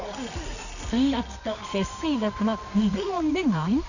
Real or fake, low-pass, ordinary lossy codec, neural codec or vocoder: fake; 7.2 kHz; none; codec, 24 kHz, 0.9 kbps, WavTokenizer, medium music audio release